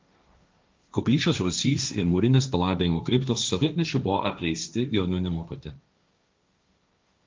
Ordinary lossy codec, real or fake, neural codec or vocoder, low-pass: Opus, 24 kbps; fake; codec, 16 kHz, 1.1 kbps, Voila-Tokenizer; 7.2 kHz